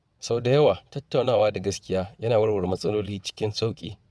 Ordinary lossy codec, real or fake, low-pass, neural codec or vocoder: none; fake; none; vocoder, 22.05 kHz, 80 mel bands, WaveNeXt